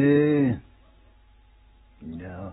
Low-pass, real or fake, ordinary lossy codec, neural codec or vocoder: 19.8 kHz; real; AAC, 16 kbps; none